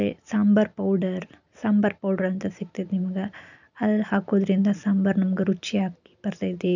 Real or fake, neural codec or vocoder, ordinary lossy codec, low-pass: real; none; none; 7.2 kHz